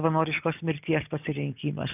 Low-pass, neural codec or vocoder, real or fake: 3.6 kHz; none; real